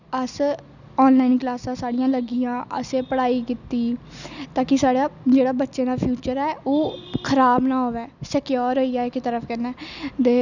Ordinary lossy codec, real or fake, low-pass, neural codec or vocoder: none; real; 7.2 kHz; none